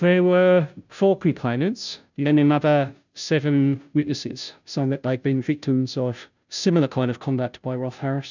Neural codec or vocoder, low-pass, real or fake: codec, 16 kHz, 0.5 kbps, FunCodec, trained on Chinese and English, 25 frames a second; 7.2 kHz; fake